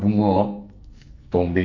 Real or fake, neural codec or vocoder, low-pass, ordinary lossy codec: fake; codec, 44.1 kHz, 2.6 kbps, SNAC; 7.2 kHz; none